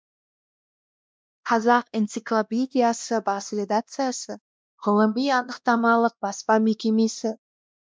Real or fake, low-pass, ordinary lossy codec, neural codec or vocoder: fake; none; none; codec, 16 kHz, 1 kbps, X-Codec, WavLM features, trained on Multilingual LibriSpeech